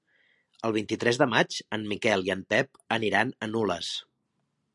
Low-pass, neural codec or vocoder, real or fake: 10.8 kHz; none; real